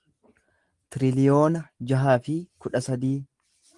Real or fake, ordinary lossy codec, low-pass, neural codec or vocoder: real; Opus, 24 kbps; 10.8 kHz; none